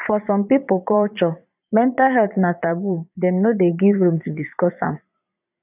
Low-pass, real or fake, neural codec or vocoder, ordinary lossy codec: 3.6 kHz; fake; vocoder, 22.05 kHz, 80 mel bands, Vocos; none